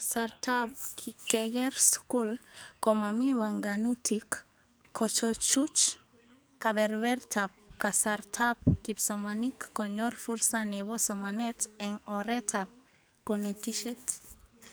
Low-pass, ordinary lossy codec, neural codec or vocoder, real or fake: none; none; codec, 44.1 kHz, 2.6 kbps, SNAC; fake